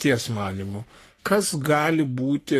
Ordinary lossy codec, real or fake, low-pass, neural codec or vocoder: AAC, 48 kbps; fake; 14.4 kHz; codec, 44.1 kHz, 3.4 kbps, Pupu-Codec